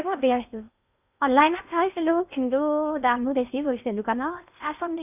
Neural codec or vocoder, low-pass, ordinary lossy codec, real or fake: codec, 16 kHz in and 24 kHz out, 0.6 kbps, FocalCodec, streaming, 4096 codes; 3.6 kHz; none; fake